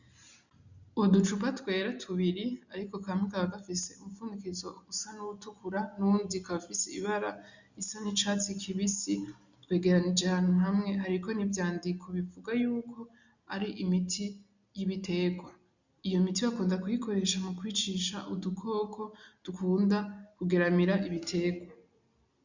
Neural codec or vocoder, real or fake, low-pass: none; real; 7.2 kHz